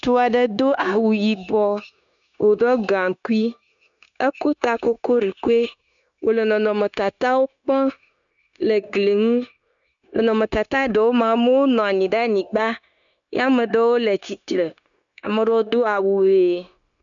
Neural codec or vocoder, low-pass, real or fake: codec, 16 kHz, 0.9 kbps, LongCat-Audio-Codec; 7.2 kHz; fake